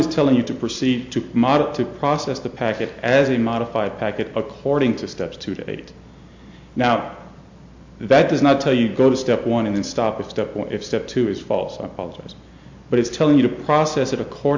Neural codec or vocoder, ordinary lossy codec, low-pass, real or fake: none; MP3, 64 kbps; 7.2 kHz; real